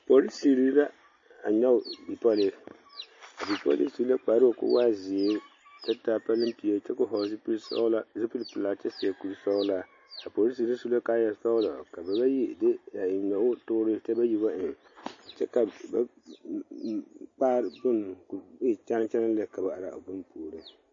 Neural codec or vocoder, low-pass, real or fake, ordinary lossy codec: none; 7.2 kHz; real; MP3, 32 kbps